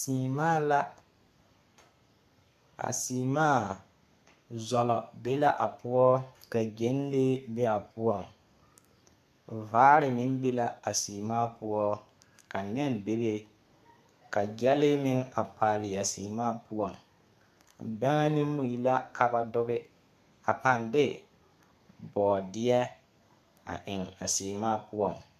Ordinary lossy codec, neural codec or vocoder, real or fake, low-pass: MP3, 96 kbps; codec, 32 kHz, 1.9 kbps, SNAC; fake; 14.4 kHz